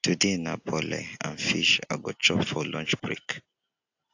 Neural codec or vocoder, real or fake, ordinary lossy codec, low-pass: none; real; AAC, 48 kbps; 7.2 kHz